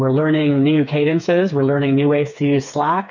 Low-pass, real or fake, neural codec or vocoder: 7.2 kHz; fake; codec, 16 kHz, 4 kbps, FreqCodec, smaller model